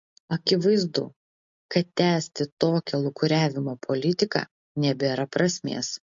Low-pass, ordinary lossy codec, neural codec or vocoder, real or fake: 7.2 kHz; MP3, 48 kbps; none; real